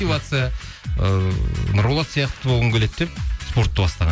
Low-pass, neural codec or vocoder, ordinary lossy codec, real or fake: none; none; none; real